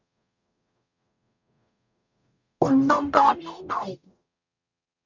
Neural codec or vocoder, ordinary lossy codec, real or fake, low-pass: codec, 44.1 kHz, 0.9 kbps, DAC; none; fake; 7.2 kHz